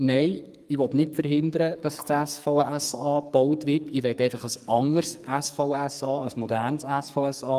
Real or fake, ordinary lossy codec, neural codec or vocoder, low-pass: fake; Opus, 32 kbps; codec, 44.1 kHz, 2.6 kbps, SNAC; 14.4 kHz